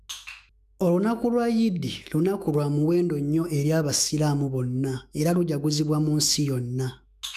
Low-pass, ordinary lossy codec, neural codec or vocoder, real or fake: 14.4 kHz; none; autoencoder, 48 kHz, 128 numbers a frame, DAC-VAE, trained on Japanese speech; fake